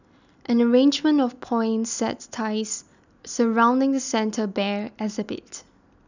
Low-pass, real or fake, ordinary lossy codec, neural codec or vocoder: 7.2 kHz; real; none; none